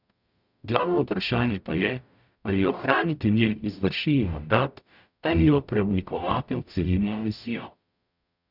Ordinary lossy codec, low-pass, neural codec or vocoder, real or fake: none; 5.4 kHz; codec, 44.1 kHz, 0.9 kbps, DAC; fake